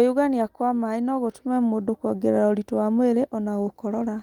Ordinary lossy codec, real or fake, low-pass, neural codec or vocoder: Opus, 24 kbps; real; 19.8 kHz; none